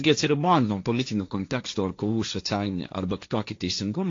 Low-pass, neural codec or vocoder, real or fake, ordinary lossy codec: 7.2 kHz; codec, 16 kHz, 1.1 kbps, Voila-Tokenizer; fake; AAC, 48 kbps